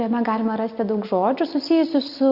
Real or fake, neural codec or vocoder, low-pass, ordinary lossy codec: real; none; 5.4 kHz; Opus, 64 kbps